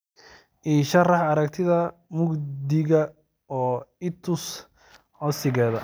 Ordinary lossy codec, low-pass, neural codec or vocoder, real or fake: none; none; none; real